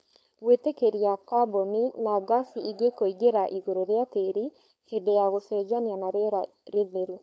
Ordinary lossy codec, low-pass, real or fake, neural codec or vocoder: none; none; fake; codec, 16 kHz, 4.8 kbps, FACodec